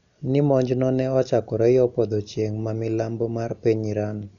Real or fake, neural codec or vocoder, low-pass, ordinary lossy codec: real; none; 7.2 kHz; none